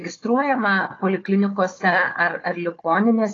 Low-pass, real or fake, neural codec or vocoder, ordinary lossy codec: 7.2 kHz; fake; codec, 16 kHz, 4 kbps, FunCodec, trained on Chinese and English, 50 frames a second; AAC, 32 kbps